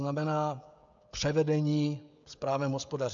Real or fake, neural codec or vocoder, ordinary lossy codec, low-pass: fake; codec, 16 kHz, 16 kbps, FreqCodec, smaller model; AAC, 64 kbps; 7.2 kHz